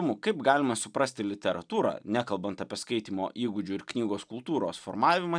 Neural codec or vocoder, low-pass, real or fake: none; 9.9 kHz; real